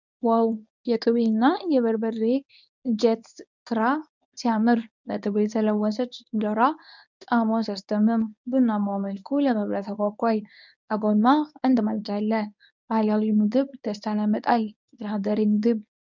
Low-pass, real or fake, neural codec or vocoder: 7.2 kHz; fake; codec, 24 kHz, 0.9 kbps, WavTokenizer, medium speech release version 1